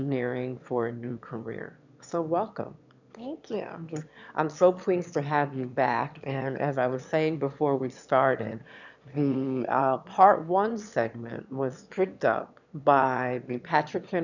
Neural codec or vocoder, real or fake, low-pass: autoencoder, 22.05 kHz, a latent of 192 numbers a frame, VITS, trained on one speaker; fake; 7.2 kHz